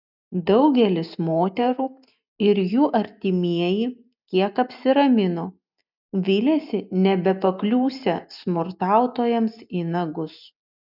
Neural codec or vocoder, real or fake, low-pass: none; real; 5.4 kHz